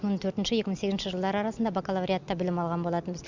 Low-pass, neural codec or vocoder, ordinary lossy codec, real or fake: 7.2 kHz; none; Opus, 64 kbps; real